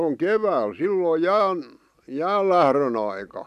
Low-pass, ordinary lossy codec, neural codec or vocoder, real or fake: 14.4 kHz; none; none; real